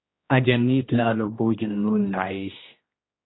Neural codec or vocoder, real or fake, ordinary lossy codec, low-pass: codec, 16 kHz, 1 kbps, X-Codec, HuBERT features, trained on general audio; fake; AAC, 16 kbps; 7.2 kHz